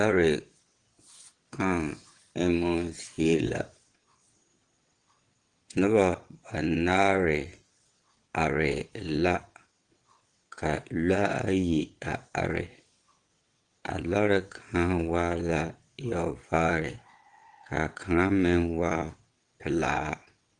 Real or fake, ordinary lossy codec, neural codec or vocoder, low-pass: fake; Opus, 24 kbps; vocoder, 22.05 kHz, 80 mel bands, WaveNeXt; 9.9 kHz